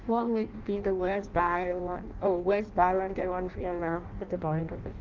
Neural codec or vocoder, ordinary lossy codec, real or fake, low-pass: codec, 16 kHz in and 24 kHz out, 0.6 kbps, FireRedTTS-2 codec; Opus, 24 kbps; fake; 7.2 kHz